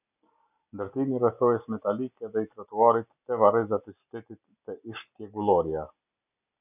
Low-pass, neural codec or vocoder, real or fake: 3.6 kHz; none; real